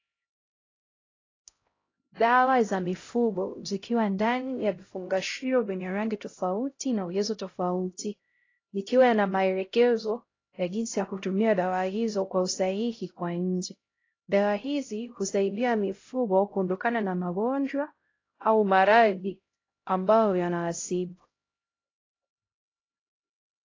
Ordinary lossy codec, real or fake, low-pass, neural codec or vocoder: AAC, 32 kbps; fake; 7.2 kHz; codec, 16 kHz, 0.5 kbps, X-Codec, HuBERT features, trained on LibriSpeech